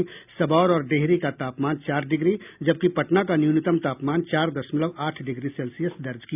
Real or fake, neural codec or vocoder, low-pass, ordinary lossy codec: real; none; 3.6 kHz; none